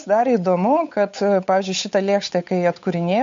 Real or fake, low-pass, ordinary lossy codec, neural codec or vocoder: fake; 7.2 kHz; MP3, 48 kbps; codec, 16 kHz, 8 kbps, FunCodec, trained on Chinese and English, 25 frames a second